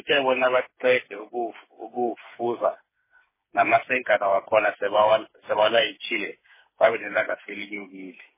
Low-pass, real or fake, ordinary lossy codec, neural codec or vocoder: 3.6 kHz; fake; MP3, 16 kbps; codec, 16 kHz, 4 kbps, FreqCodec, smaller model